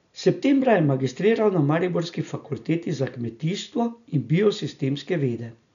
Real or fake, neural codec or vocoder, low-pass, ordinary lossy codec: real; none; 7.2 kHz; none